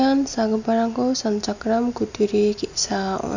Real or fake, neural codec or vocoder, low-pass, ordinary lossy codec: real; none; 7.2 kHz; none